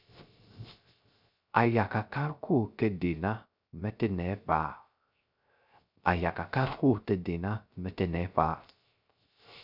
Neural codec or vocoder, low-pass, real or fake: codec, 16 kHz, 0.3 kbps, FocalCodec; 5.4 kHz; fake